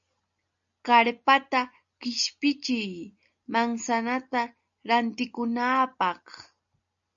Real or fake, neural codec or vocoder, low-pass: real; none; 7.2 kHz